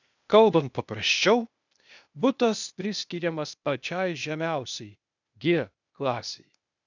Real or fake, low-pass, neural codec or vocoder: fake; 7.2 kHz; codec, 16 kHz, 0.8 kbps, ZipCodec